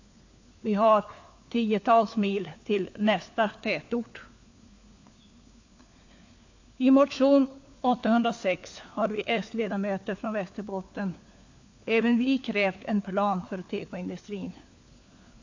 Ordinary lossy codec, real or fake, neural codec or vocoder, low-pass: AAC, 48 kbps; fake; codec, 16 kHz, 4 kbps, FunCodec, trained on LibriTTS, 50 frames a second; 7.2 kHz